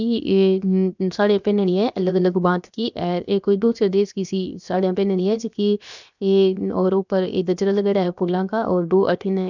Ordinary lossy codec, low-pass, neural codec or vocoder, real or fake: none; 7.2 kHz; codec, 16 kHz, 0.7 kbps, FocalCodec; fake